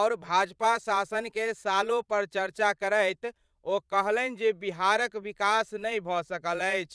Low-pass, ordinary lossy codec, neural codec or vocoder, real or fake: none; none; vocoder, 22.05 kHz, 80 mel bands, Vocos; fake